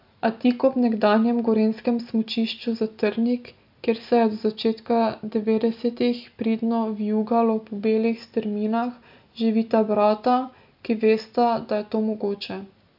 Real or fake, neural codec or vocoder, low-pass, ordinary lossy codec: real; none; 5.4 kHz; AAC, 48 kbps